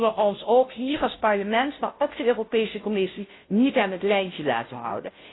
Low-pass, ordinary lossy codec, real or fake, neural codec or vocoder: 7.2 kHz; AAC, 16 kbps; fake; codec, 16 kHz, 0.5 kbps, FunCodec, trained on Chinese and English, 25 frames a second